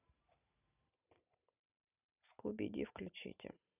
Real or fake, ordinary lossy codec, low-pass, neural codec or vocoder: fake; none; 3.6 kHz; vocoder, 44.1 kHz, 128 mel bands every 512 samples, BigVGAN v2